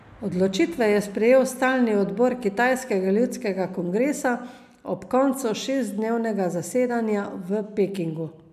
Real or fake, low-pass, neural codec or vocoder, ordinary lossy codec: real; 14.4 kHz; none; none